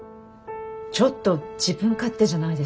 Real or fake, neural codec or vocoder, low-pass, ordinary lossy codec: real; none; none; none